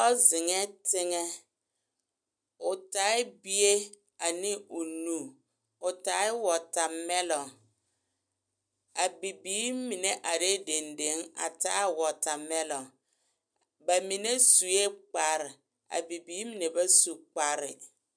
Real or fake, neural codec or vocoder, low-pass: real; none; 9.9 kHz